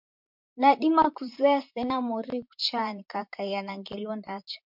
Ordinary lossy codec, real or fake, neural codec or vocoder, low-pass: MP3, 48 kbps; fake; vocoder, 44.1 kHz, 128 mel bands, Pupu-Vocoder; 5.4 kHz